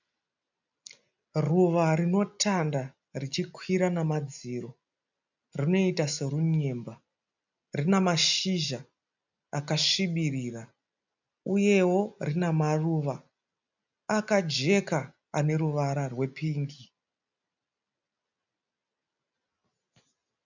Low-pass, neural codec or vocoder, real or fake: 7.2 kHz; none; real